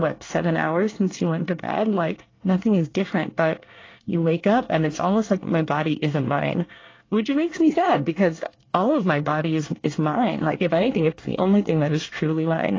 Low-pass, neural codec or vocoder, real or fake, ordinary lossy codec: 7.2 kHz; codec, 24 kHz, 1 kbps, SNAC; fake; AAC, 32 kbps